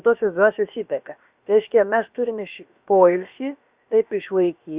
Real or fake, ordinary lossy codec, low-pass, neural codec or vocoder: fake; Opus, 64 kbps; 3.6 kHz; codec, 16 kHz, about 1 kbps, DyCAST, with the encoder's durations